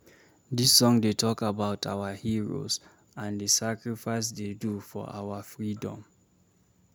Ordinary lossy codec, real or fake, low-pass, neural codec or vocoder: none; real; none; none